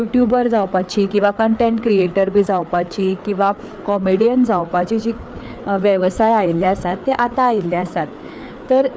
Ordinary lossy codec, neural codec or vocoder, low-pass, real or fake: none; codec, 16 kHz, 4 kbps, FreqCodec, larger model; none; fake